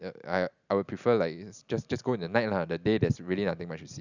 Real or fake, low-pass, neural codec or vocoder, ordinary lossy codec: real; 7.2 kHz; none; none